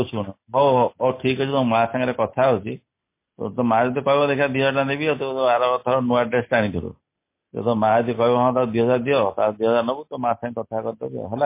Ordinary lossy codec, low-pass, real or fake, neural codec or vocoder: MP3, 24 kbps; 3.6 kHz; real; none